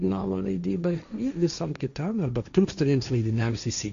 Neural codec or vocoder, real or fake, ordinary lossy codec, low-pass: codec, 16 kHz, 1.1 kbps, Voila-Tokenizer; fake; AAC, 96 kbps; 7.2 kHz